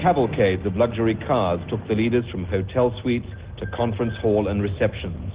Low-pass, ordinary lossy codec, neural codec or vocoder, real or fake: 3.6 kHz; Opus, 16 kbps; none; real